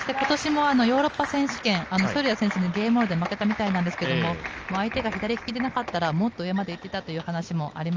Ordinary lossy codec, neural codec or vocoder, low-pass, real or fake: Opus, 24 kbps; none; 7.2 kHz; real